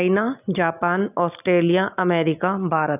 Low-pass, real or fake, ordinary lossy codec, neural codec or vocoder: 3.6 kHz; real; none; none